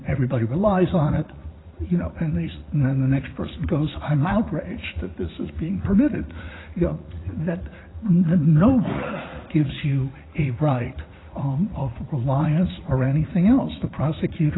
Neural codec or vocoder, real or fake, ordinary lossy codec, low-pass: vocoder, 44.1 kHz, 80 mel bands, Vocos; fake; AAC, 16 kbps; 7.2 kHz